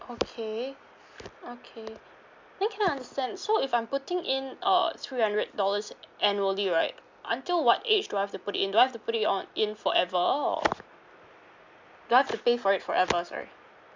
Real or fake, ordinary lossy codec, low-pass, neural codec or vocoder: real; MP3, 64 kbps; 7.2 kHz; none